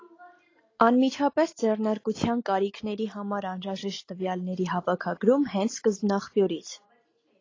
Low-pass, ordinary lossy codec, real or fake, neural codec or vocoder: 7.2 kHz; AAC, 32 kbps; real; none